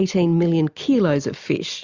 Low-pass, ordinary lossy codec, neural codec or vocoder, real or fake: 7.2 kHz; Opus, 64 kbps; none; real